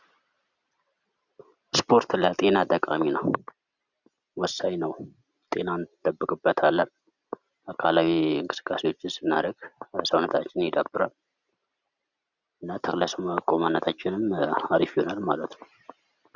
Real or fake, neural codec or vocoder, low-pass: real; none; 7.2 kHz